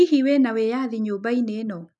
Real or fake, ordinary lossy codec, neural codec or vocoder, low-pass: real; none; none; 10.8 kHz